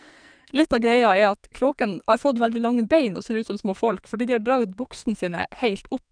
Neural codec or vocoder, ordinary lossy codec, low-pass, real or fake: codec, 44.1 kHz, 2.6 kbps, SNAC; none; 9.9 kHz; fake